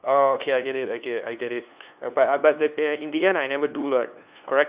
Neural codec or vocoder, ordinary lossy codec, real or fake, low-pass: codec, 16 kHz, 2 kbps, FunCodec, trained on LibriTTS, 25 frames a second; Opus, 64 kbps; fake; 3.6 kHz